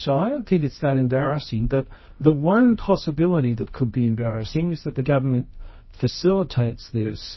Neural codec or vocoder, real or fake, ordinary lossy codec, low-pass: codec, 24 kHz, 0.9 kbps, WavTokenizer, medium music audio release; fake; MP3, 24 kbps; 7.2 kHz